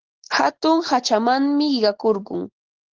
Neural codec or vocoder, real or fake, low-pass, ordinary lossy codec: none; real; 7.2 kHz; Opus, 16 kbps